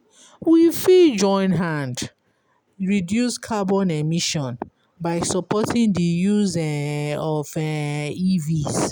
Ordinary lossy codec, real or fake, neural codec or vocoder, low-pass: none; real; none; none